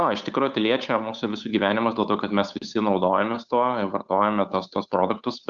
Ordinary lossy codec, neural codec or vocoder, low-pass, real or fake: Opus, 64 kbps; codec, 16 kHz, 8 kbps, FunCodec, trained on Chinese and English, 25 frames a second; 7.2 kHz; fake